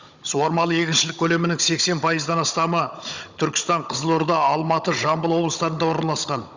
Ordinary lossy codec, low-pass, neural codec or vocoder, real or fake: Opus, 64 kbps; 7.2 kHz; codec, 16 kHz, 16 kbps, FunCodec, trained on Chinese and English, 50 frames a second; fake